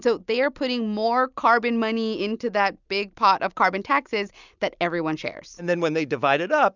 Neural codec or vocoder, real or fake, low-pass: none; real; 7.2 kHz